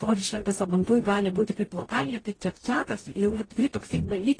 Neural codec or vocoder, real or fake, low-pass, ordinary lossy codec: codec, 44.1 kHz, 0.9 kbps, DAC; fake; 9.9 kHz; AAC, 32 kbps